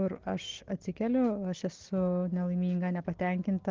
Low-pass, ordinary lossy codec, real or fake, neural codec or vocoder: 7.2 kHz; Opus, 16 kbps; real; none